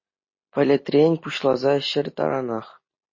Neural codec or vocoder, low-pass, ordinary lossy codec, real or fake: none; 7.2 kHz; MP3, 32 kbps; real